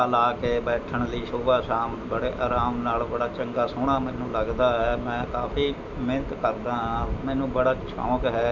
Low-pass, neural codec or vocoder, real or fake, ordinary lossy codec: 7.2 kHz; none; real; none